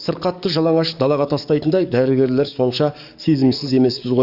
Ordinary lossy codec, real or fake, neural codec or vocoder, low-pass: Opus, 64 kbps; fake; codec, 44.1 kHz, 7.8 kbps, DAC; 5.4 kHz